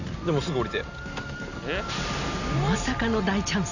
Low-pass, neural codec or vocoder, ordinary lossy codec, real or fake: 7.2 kHz; none; none; real